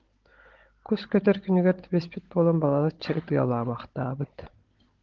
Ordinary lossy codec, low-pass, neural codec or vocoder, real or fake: Opus, 16 kbps; 7.2 kHz; codec, 16 kHz, 16 kbps, FunCodec, trained on Chinese and English, 50 frames a second; fake